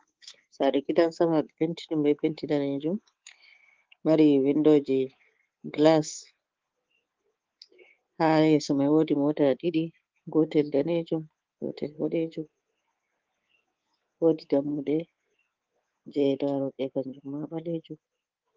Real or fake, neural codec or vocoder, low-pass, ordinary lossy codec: fake; codec, 24 kHz, 3.1 kbps, DualCodec; 7.2 kHz; Opus, 16 kbps